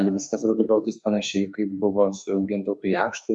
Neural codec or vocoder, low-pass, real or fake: autoencoder, 48 kHz, 32 numbers a frame, DAC-VAE, trained on Japanese speech; 10.8 kHz; fake